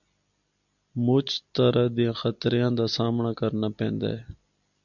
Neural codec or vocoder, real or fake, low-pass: none; real; 7.2 kHz